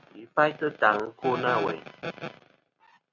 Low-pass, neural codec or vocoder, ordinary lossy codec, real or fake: 7.2 kHz; none; Opus, 64 kbps; real